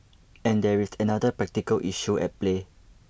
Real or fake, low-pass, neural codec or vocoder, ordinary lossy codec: real; none; none; none